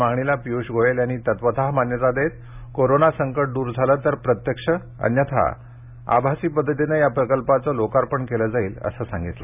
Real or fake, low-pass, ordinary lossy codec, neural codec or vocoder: real; 3.6 kHz; none; none